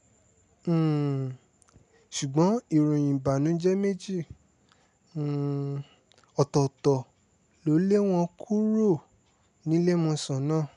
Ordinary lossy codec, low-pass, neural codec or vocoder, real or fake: none; 10.8 kHz; none; real